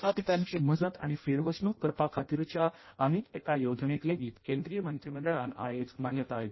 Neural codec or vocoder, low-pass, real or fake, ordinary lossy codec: codec, 16 kHz in and 24 kHz out, 0.6 kbps, FireRedTTS-2 codec; 7.2 kHz; fake; MP3, 24 kbps